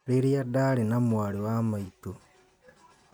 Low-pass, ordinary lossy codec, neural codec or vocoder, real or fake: none; none; none; real